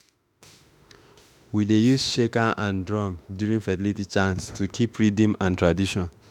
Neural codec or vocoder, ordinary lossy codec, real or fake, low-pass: autoencoder, 48 kHz, 32 numbers a frame, DAC-VAE, trained on Japanese speech; none; fake; 19.8 kHz